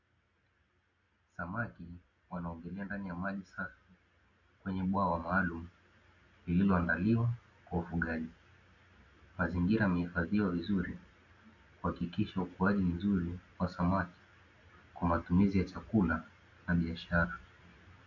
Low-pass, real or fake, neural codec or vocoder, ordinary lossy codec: 7.2 kHz; real; none; AAC, 48 kbps